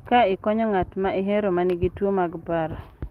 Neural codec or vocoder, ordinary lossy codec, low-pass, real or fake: none; Opus, 24 kbps; 14.4 kHz; real